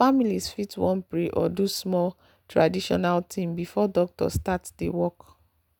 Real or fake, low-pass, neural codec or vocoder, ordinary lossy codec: real; none; none; none